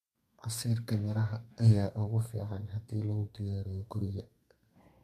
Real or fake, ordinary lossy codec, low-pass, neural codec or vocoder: fake; MP3, 64 kbps; 14.4 kHz; codec, 32 kHz, 1.9 kbps, SNAC